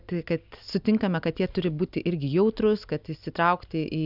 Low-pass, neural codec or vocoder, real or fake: 5.4 kHz; none; real